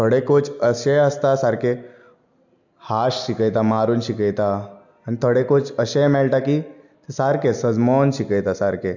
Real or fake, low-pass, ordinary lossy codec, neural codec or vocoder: real; 7.2 kHz; none; none